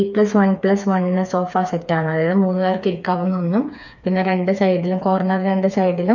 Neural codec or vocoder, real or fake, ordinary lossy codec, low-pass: codec, 16 kHz, 4 kbps, FreqCodec, smaller model; fake; none; 7.2 kHz